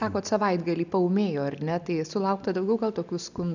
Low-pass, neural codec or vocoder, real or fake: 7.2 kHz; none; real